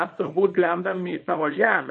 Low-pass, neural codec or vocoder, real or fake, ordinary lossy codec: 10.8 kHz; codec, 24 kHz, 0.9 kbps, WavTokenizer, small release; fake; MP3, 32 kbps